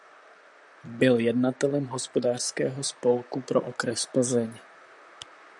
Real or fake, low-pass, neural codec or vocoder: fake; 10.8 kHz; vocoder, 44.1 kHz, 128 mel bands, Pupu-Vocoder